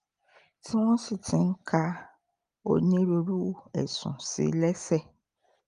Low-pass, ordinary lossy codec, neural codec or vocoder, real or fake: 9.9 kHz; Opus, 32 kbps; none; real